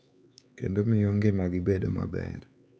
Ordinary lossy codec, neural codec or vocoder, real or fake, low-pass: none; codec, 16 kHz, 4 kbps, X-Codec, HuBERT features, trained on LibriSpeech; fake; none